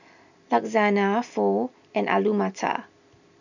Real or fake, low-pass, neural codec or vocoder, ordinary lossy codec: real; 7.2 kHz; none; none